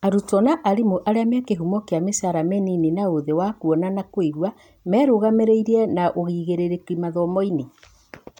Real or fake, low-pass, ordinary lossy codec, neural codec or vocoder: real; 19.8 kHz; none; none